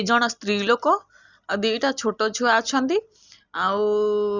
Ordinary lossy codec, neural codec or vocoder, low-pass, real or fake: Opus, 64 kbps; none; 7.2 kHz; real